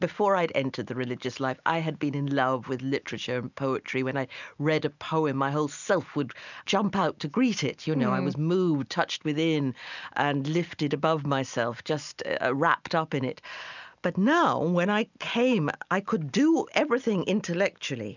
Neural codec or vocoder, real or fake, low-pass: none; real; 7.2 kHz